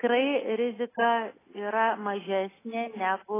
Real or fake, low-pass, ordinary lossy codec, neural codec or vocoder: real; 3.6 kHz; AAC, 16 kbps; none